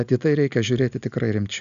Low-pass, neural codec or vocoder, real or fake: 7.2 kHz; none; real